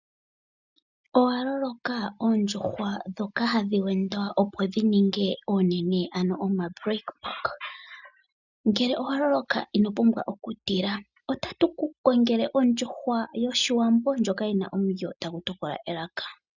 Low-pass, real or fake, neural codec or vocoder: 7.2 kHz; real; none